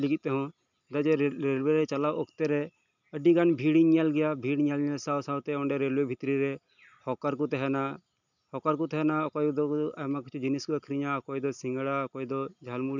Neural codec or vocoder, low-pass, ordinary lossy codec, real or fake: none; 7.2 kHz; none; real